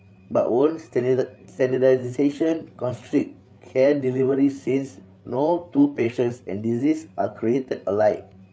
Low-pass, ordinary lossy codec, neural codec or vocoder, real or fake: none; none; codec, 16 kHz, 8 kbps, FreqCodec, larger model; fake